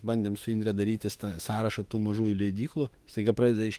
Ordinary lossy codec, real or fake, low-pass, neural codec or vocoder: Opus, 24 kbps; fake; 14.4 kHz; autoencoder, 48 kHz, 32 numbers a frame, DAC-VAE, trained on Japanese speech